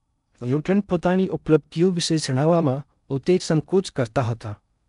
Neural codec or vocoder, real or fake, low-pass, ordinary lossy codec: codec, 16 kHz in and 24 kHz out, 0.6 kbps, FocalCodec, streaming, 2048 codes; fake; 10.8 kHz; MP3, 96 kbps